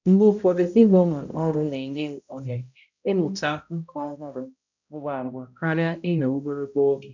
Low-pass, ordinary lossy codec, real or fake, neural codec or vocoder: 7.2 kHz; none; fake; codec, 16 kHz, 0.5 kbps, X-Codec, HuBERT features, trained on balanced general audio